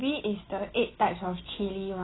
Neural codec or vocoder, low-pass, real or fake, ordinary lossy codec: none; 7.2 kHz; real; AAC, 16 kbps